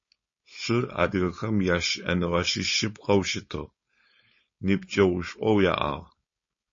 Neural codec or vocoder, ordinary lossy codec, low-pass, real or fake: codec, 16 kHz, 4.8 kbps, FACodec; MP3, 32 kbps; 7.2 kHz; fake